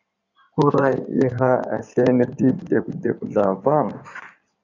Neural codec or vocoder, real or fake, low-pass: codec, 16 kHz in and 24 kHz out, 2.2 kbps, FireRedTTS-2 codec; fake; 7.2 kHz